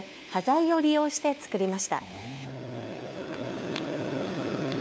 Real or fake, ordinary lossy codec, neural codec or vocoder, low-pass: fake; none; codec, 16 kHz, 4 kbps, FunCodec, trained on LibriTTS, 50 frames a second; none